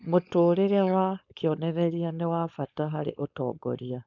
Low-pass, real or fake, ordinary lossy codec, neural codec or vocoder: 7.2 kHz; fake; none; codec, 16 kHz, 4.8 kbps, FACodec